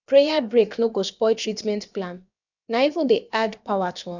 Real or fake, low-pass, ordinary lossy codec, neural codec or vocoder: fake; 7.2 kHz; none; codec, 16 kHz, about 1 kbps, DyCAST, with the encoder's durations